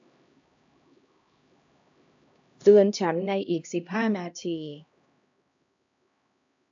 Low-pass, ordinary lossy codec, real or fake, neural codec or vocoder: 7.2 kHz; AAC, 64 kbps; fake; codec, 16 kHz, 1 kbps, X-Codec, HuBERT features, trained on LibriSpeech